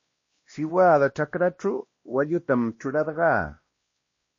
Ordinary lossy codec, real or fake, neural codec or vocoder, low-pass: MP3, 32 kbps; fake; codec, 16 kHz, 1 kbps, X-Codec, WavLM features, trained on Multilingual LibriSpeech; 7.2 kHz